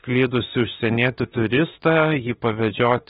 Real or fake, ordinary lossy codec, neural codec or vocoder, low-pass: fake; AAC, 16 kbps; autoencoder, 48 kHz, 32 numbers a frame, DAC-VAE, trained on Japanese speech; 19.8 kHz